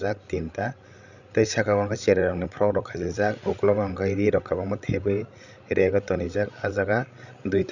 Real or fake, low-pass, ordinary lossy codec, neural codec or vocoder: fake; 7.2 kHz; none; codec, 16 kHz, 16 kbps, FreqCodec, larger model